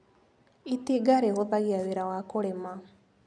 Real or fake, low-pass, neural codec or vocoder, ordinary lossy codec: real; 9.9 kHz; none; none